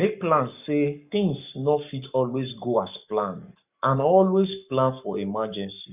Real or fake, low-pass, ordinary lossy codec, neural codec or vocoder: fake; 3.6 kHz; none; codec, 44.1 kHz, 7.8 kbps, Pupu-Codec